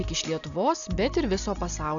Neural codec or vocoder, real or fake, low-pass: none; real; 7.2 kHz